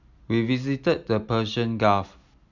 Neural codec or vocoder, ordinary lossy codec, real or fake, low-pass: none; none; real; 7.2 kHz